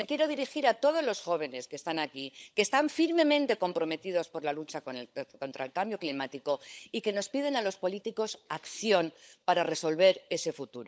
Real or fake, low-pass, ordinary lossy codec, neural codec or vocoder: fake; none; none; codec, 16 kHz, 16 kbps, FunCodec, trained on LibriTTS, 50 frames a second